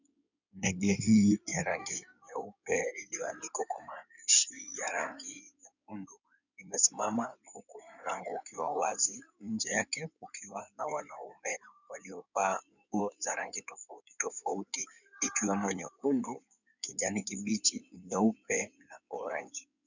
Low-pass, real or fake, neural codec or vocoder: 7.2 kHz; fake; codec, 16 kHz in and 24 kHz out, 2.2 kbps, FireRedTTS-2 codec